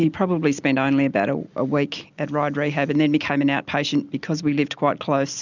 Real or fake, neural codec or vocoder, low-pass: real; none; 7.2 kHz